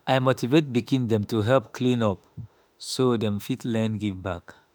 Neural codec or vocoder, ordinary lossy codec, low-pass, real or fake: autoencoder, 48 kHz, 32 numbers a frame, DAC-VAE, trained on Japanese speech; none; none; fake